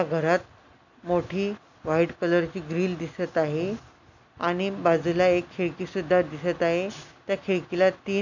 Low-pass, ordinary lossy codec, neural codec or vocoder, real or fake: 7.2 kHz; none; none; real